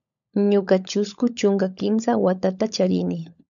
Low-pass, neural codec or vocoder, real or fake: 7.2 kHz; codec, 16 kHz, 16 kbps, FunCodec, trained on LibriTTS, 50 frames a second; fake